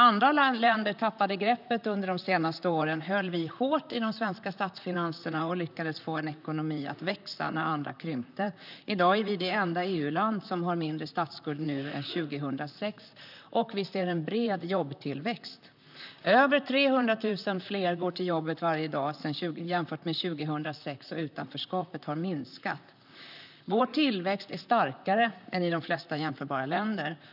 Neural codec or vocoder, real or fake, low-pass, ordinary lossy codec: vocoder, 44.1 kHz, 128 mel bands, Pupu-Vocoder; fake; 5.4 kHz; none